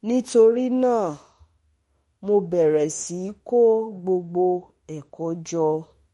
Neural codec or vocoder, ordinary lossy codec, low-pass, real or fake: autoencoder, 48 kHz, 32 numbers a frame, DAC-VAE, trained on Japanese speech; MP3, 48 kbps; 19.8 kHz; fake